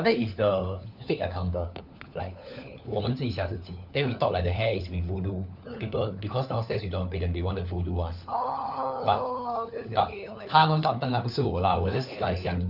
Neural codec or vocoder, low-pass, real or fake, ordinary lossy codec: codec, 16 kHz, 4 kbps, FunCodec, trained on LibriTTS, 50 frames a second; 5.4 kHz; fake; none